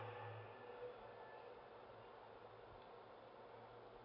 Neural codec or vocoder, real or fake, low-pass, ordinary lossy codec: none; real; 5.4 kHz; none